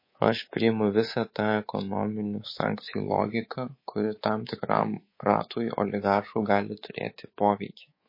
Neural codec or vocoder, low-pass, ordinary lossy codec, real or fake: codec, 24 kHz, 3.1 kbps, DualCodec; 5.4 kHz; MP3, 24 kbps; fake